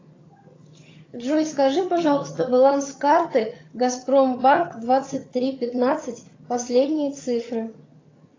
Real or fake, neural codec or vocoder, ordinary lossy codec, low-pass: fake; vocoder, 22.05 kHz, 80 mel bands, HiFi-GAN; AAC, 32 kbps; 7.2 kHz